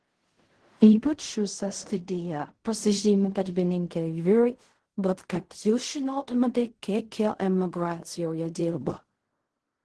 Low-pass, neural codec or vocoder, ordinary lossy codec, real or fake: 10.8 kHz; codec, 16 kHz in and 24 kHz out, 0.4 kbps, LongCat-Audio-Codec, fine tuned four codebook decoder; Opus, 16 kbps; fake